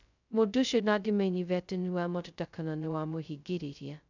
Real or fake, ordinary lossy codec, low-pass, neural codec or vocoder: fake; none; 7.2 kHz; codec, 16 kHz, 0.2 kbps, FocalCodec